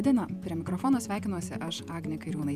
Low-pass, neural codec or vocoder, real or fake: 14.4 kHz; vocoder, 48 kHz, 128 mel bands, Vocos; fake